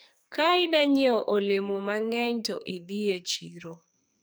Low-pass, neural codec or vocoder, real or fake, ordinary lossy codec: none; codec, 44.1 kHz, 2.6 kbps, SNAC; fake; none